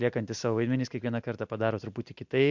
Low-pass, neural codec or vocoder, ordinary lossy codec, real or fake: 7.2 kHz; none; MP3, 64 kbps; real